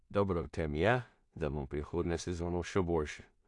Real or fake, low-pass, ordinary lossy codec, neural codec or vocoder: fake; 10.8 kHz; AAC, 64 kbps; codec, 16 kHz in and 24 kHz out, 0.4 kbps, LongCat-Audio-Codec, two codebook decoder